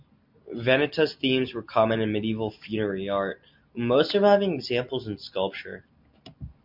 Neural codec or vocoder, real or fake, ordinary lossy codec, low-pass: none; real; MP3, 48 kbps; 5.4 kHz